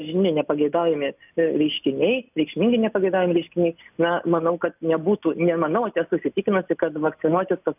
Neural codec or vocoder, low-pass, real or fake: none; 3.6 kHz; real